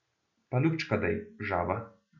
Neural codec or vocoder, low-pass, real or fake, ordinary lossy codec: none; 7.2 kHz; real; none